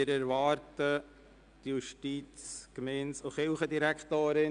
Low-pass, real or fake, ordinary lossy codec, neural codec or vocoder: 9.9 kHz; real; AAC, 96 kbps; none